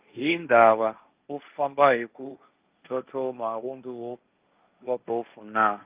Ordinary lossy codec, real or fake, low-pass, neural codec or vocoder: Opus, 24 kbps; fake; 3.6 kHz; codec, 16 kHz, 1.1 kbps, Voila-Tokenizer